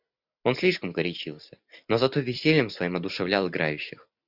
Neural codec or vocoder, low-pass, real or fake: none; 5.4 kHz; real